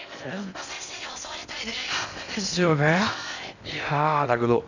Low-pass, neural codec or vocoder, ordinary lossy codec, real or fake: 7.2 kHz; codec, 16 kHz in and 24 kHz out, 0.6 kbps, FocalCodec, streaming, 4096 codes; none; fake